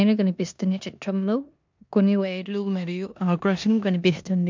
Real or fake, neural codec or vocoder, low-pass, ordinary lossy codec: fake; codec, 16 kHz in and 24 kHz out, 0.9 kbps, LongCat-Audio-Codec, four codebook decoder; 7.2 kHz; MP3, 64 kbps